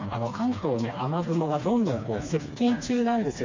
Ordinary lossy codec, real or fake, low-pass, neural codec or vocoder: MP3, 48 kbps; fake; 7.2 kHz; codec, 16 kHz, 2 kbps, FreqCodec, smaller model